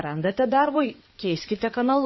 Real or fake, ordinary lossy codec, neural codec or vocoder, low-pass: fake; MP3, 24 kbps; codec, 16 kHz in and 24 kHz out, 2.2 kbps, FireRedTTS-2 codec; 7.2 kHz